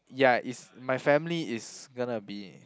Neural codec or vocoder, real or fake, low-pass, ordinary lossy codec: none; real; none; none